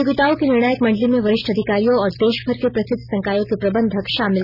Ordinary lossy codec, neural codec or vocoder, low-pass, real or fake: none; none; 5.4 kHz; real